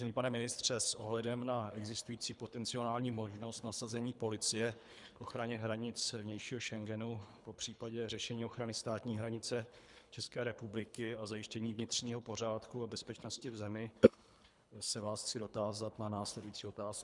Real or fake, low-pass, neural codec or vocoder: fake; 10.8 kHz; codec, 24 kHz, 3 kbps, HILCodec